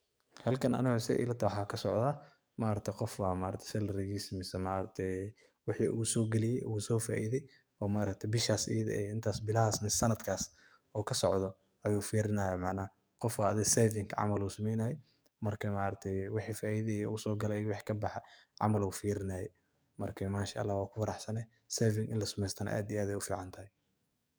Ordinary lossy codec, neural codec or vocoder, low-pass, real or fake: none; codec, 44.1 kHz, 7.8 kbps, DAC; none; fake